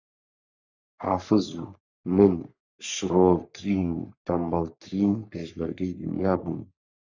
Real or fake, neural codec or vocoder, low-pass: fake; codec, 44.1 kHz, 3.4 kbps, Pupu-Codec; 7.2 kHz